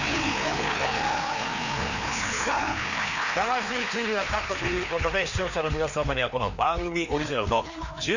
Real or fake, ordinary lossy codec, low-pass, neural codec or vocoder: fake; none; 7.2 kHz; codec, 16 kHz, 2 kbps, FreqCodec, larger model